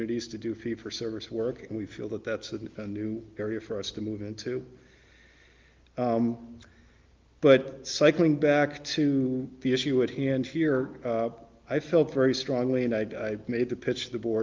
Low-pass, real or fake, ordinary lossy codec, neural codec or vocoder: 7.2 kHz; real; Opus, 32 kbps; none